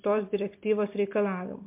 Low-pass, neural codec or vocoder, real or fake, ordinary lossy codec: 3.6 kHz; none; real; MP3, 32 kbps